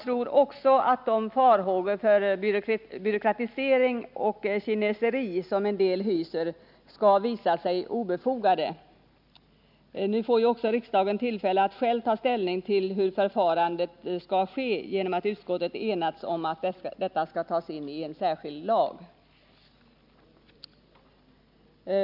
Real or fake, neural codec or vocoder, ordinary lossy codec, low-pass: real; none; none; 5.4 kHz